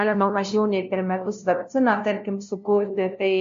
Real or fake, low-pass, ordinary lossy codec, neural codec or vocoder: fake; 7.2 kHz; MP3, 48 kbps; codec, 16 kHz, 0.5 kbps, FunCodec, trained on LibriTTS, 25 frames a second